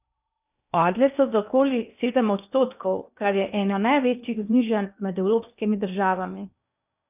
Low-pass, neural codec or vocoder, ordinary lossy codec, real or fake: 3.6 kHz; codec, 16 kHz in and 24 kHz out, 0.8 kbps, FocalCodec, streaming, 65536 codes; none; fake